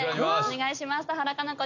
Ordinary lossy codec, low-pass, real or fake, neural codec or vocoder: none; 7.2 kHz; real; none